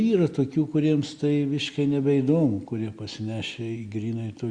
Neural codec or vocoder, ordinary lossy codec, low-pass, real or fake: none; Opus, 64 kbps; 9.9 kHz; real